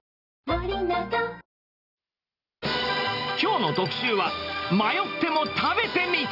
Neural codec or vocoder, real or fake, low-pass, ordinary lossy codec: none; real; 5.4 kHz; none